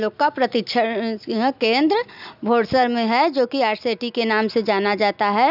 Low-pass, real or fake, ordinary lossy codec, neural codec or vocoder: 5.4 kHz; real; none; none